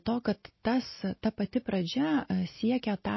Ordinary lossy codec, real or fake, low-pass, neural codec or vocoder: MP3, 24 kbps; real; 7.2 kHz; none